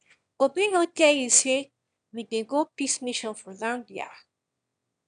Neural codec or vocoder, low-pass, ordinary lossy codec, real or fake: autoencoder, 22.05 kHz, a latent of 192 numbers a frame, VITS, trained on one speaker; 9.9 kHz; none; fake